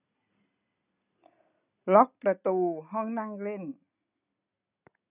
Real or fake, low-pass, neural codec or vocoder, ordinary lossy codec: real; 3.6 kHz; none; none